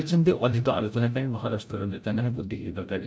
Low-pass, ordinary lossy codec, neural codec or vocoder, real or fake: none; none; codec, 16 kHz, 0.5 kbps, FreqCodec, larger model; fake